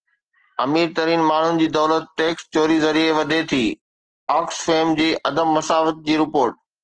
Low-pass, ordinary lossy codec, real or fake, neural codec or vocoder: 9.9 kHz; Opus, 16 kbps; real; none